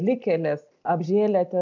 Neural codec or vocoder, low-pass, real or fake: none; 7.2 kHz; real